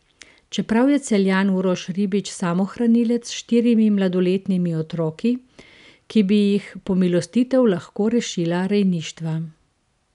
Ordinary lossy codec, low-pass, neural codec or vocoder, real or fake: none; 10.8 kHz; none; real